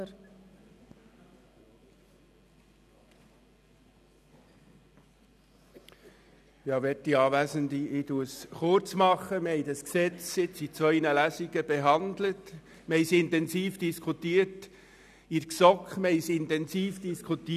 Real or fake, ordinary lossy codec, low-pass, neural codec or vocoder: real; none; 14.4 kHz; none